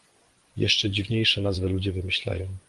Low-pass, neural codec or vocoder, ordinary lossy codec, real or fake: 10.8 kHz; vocoder, 24 kHz, 100 mel bands, Vocos; Opus, 24 kbps; fake